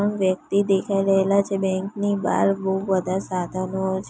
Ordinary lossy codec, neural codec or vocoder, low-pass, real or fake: none; none; none; real